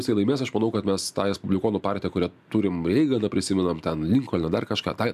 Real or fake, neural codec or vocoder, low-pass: real; none; 14.4 kHz